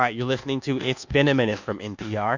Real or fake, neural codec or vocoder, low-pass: fake; codec, 24 kHz, 1.2 kbps, DualCodec; 7.2 kHz